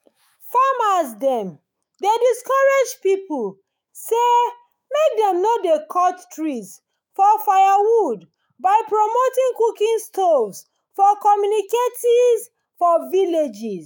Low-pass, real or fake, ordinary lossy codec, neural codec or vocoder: none; fake; none; autoencoder, 48 kHz, 128 numbers a frame, DAC-VAE, trained on Japanese speech